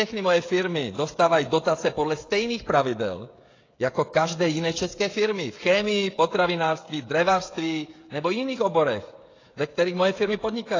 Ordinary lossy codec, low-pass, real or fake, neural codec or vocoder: AAC, 32 kbps; 7.2 kHz; fake; codec, 16 kHz, 16 kbps, FreqCodec, smaller model